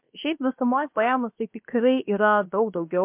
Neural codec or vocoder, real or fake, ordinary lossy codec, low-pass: codec, 16 kHz, about 1 kbps, DyCAST, with the encoder's durations; fake; MP3, 32 kbps; 3.6 kHz